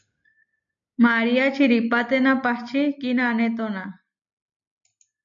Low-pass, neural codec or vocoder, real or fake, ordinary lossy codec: 7.2 kHz; none; real; MP3, 64 kbps